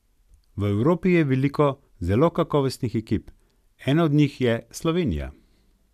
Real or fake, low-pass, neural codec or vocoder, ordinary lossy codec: real; 14.4 kHz; none; none